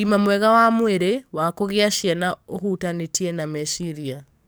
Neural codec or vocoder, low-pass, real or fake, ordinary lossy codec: codec, 44.1 kHz, 7.8 kbps, DAC; none; fake; none